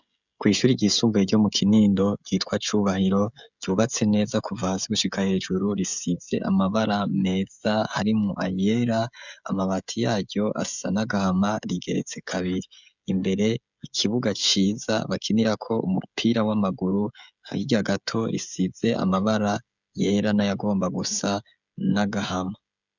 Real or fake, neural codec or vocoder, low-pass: fake; codec, 16 kHz, 16 kbps, FreqCodec, smaller model; 7.2 kHz